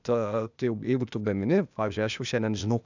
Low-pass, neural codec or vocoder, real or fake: 7.2 kHz; codec, 16 kHz, 0.8 kbps, ZipCodec; fake